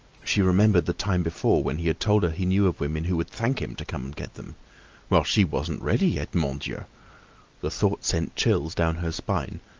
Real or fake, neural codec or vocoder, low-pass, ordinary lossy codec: real; none; 7.2 kHz; Opus, 24 kbps